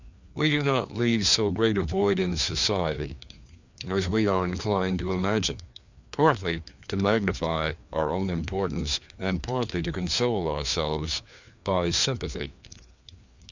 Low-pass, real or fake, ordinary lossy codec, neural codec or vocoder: 7.2 kHz; fake; Opus, 64 kbps; codec, 16 kHz, 2 kbps, FreqCodec, larger model